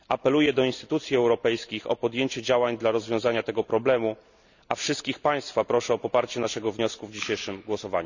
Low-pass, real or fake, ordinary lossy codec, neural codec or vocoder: 7.2 kHz; real; none; none